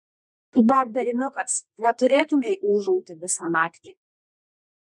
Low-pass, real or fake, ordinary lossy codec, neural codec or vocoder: 10.8 kHz; fake; MP3, 96 kbps; codec, 24 kHz, 0.9 kbps, WavTokenizer, medium music audio release